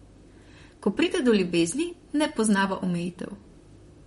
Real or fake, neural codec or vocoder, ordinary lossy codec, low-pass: fake; vocoder, 44.1 kHz, 128 mel bands, Pupu-Vocoder; MP3, 48 kbps; 19.8 kHz